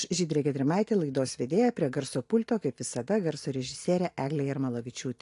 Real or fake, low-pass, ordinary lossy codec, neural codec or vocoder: real; 10.8 kHz; AAC, 48 kbps; none